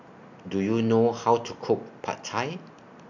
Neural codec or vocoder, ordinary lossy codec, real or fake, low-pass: none; none; real; 7.2 kHz